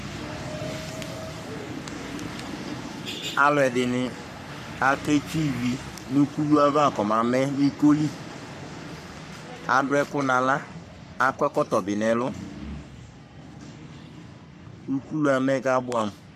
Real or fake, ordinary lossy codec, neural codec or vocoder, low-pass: fake; MP3, 96 kbps; codec, 44.1 kHz, 3.4 kbps, Pupu-Codec; 14.4 kHz